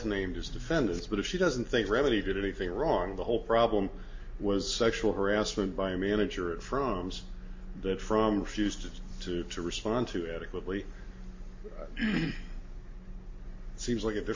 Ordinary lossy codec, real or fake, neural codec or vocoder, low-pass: MP3, 32 kbps; real; none; 7.2 kHz